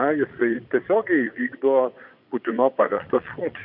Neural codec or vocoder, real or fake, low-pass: vocoder, 22.05 kHz, 80 mel bands, Vocos; fake; 5.4 kHz